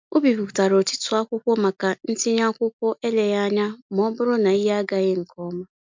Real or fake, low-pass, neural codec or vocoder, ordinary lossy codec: real; 7.2 kHz; none; MP3, 64 kbps